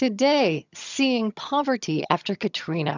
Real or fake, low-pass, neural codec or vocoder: fake; 7.2 kHz; vocoder, 22.05 kHz, 80 mel bands, HiFi-GAN